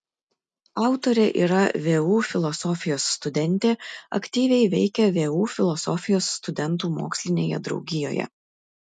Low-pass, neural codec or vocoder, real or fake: 10.8 kHz; none; real